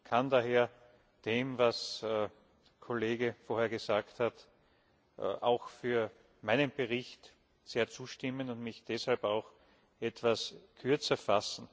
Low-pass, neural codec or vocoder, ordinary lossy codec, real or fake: none; none; none; real